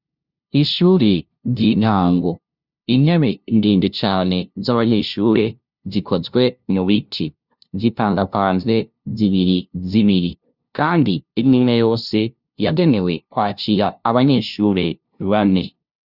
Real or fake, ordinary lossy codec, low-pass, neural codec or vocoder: fake; Opus, 64 kbps; 5.4 kHz; codec, 16 kHz, 0.5 kbps, FunCodec, trained on LibriTTS, 25 frames a second